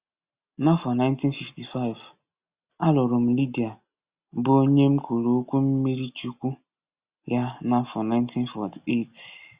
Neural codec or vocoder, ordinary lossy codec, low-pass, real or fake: none; Opus, 64 kbps; 3.6 kHz; real